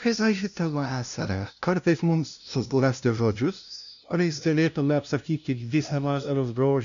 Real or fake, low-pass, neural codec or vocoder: fake; 7.2 kHz; codec, 16 kHz, 0.5 kbps, FunCodec, trained on LibriTTS, 25 frames a second